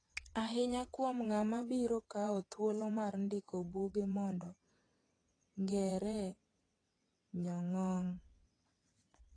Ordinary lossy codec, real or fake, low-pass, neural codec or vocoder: AAC, 32 kbps; fake; 9.9 kHz; vocoder, 22.05 kHz, 80 mel bands, WaveNeXt